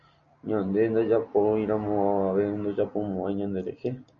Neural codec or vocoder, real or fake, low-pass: none; real; 7.2 kHz